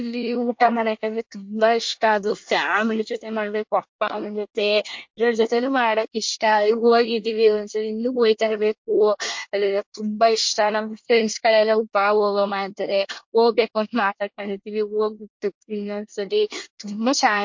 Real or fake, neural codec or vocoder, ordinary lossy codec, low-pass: fake; codec, 24 kHz, 1 kbps, SNAC; MP3, 48 kbps; 7.2 kHz